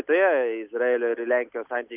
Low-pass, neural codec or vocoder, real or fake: 3.6 kHz; none; real